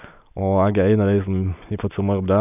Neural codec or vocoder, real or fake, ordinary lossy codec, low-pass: none; real; none; 3.6 kHz